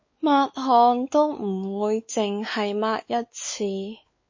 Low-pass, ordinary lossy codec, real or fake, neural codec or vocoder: 7.2 kHz; MP3, 32 kbps; fake; codec, 16 kHz, 4 kbps, X-Codec, WavLM features, trained on Multilingual LibriSpeech